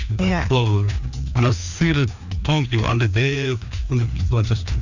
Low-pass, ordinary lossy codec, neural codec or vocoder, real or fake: 7.2 kHz; none; codec, 16 kHz, 2 kbps, FreqCodec, larger model; fake